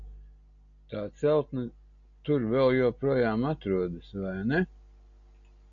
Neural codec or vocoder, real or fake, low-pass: none; real; 7.2 kHz